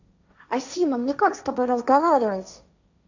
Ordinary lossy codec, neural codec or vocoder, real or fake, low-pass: none; codec, 16 kHz, 1.1 kbps, Voila-Tokenizer; fake; 7.2 kHz